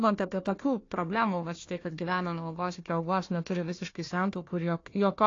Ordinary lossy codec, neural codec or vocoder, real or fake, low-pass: AAC, 32 kbps; codec, 16 kHz, 1 kbps, FunCodec, trained on Chinese and English, 50 frames a second; fake; 7.2 kHz